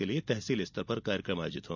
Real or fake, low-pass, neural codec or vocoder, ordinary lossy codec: real; 7.2 kHz; none; none